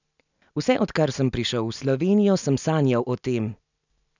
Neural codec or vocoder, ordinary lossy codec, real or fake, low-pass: none; none; real; 7.2 kHz